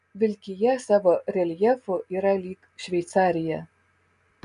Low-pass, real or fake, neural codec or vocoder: 10.8 kHz; real; none